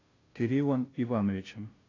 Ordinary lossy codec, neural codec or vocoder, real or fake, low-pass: AAC, 32 kbps; codec, 16 kHz, 0.5 kbps, FunCodec, trained on Chinese and English, 25 frames a second; fake; 7.2 kHz